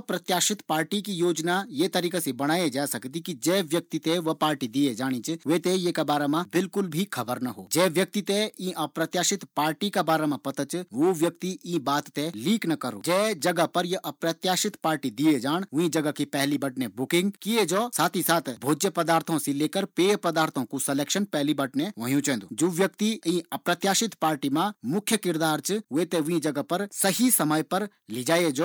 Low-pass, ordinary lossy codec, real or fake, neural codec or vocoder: none; none; real; none